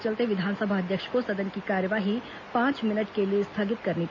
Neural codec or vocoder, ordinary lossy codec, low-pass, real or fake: none; MP3, 48 kbps; 7.2 kHz; real